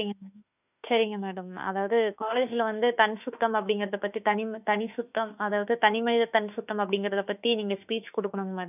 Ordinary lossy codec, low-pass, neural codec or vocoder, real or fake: none; 3.6 kHz; autoencoder, 48 kHz, 32 numbers a frame, DAC-VAE, trained on Japanese speech; fake